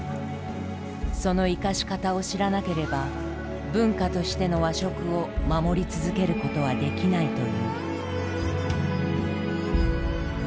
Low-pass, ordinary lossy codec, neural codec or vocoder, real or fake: none; none; none; real